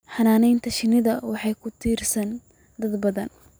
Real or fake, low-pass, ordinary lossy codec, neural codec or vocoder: real; none; none; none